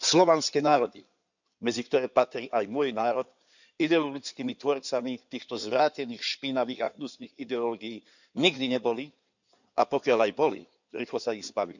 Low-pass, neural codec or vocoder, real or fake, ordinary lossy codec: 7.2 kHz; codec, 16 kHz in and 24 kHz out, 2.2 kbps, FireRedTTS-2 codec; fake; none